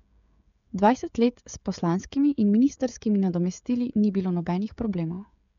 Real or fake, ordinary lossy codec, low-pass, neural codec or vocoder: fake; none; 7.2 kHz; codec, 16 kHz, 16 kbps, FreqCodec, smaller model